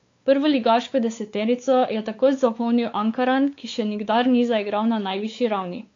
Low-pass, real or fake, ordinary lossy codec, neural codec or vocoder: 7.2 kHz; fake; AAC, 48 kbps; codec, 16 kHz, 4 kbps, X-Codec, WavLM features, trained on Multilingual LibriSpeech